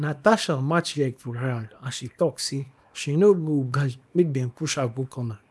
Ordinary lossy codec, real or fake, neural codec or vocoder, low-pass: none; fake; codec, 24 kHz, 0.9 kbps, WavTokenizer, small release; none